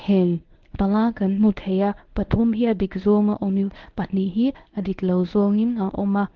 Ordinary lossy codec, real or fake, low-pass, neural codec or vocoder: Opus, 16 kbps; fake; 7.2 kHz; codec, 24 kHz, 0.9 kbps, WavTokenizer, medium speech release version 1